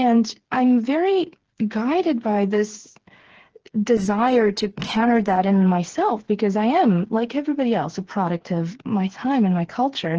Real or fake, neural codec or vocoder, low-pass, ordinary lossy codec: fake; codec, 16 kHz, 4 kbps, FreqCodec, smaller model; 7.2 kHz; Opus, 16 kbps